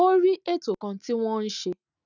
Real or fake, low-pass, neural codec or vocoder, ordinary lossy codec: real; 7.2 kHz; none; none